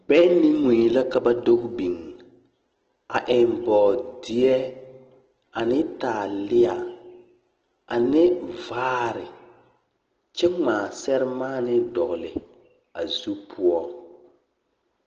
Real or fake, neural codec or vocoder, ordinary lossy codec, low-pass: real; none; Opus, 16 kbps; 7.2 kHz